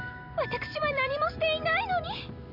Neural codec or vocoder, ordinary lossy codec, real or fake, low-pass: none; none; real; 5.4 kHz